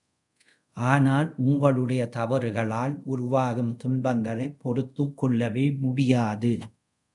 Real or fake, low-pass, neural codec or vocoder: fake; 10.8 kHz; codec, 24 kHz, 0.5 kbps, DualCodec